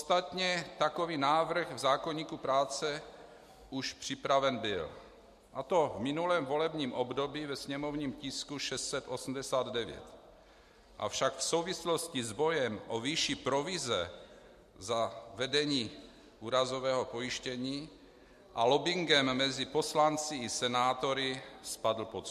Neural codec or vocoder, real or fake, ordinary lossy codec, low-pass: none; real; MP3, 64 kbps; 14.4 kHz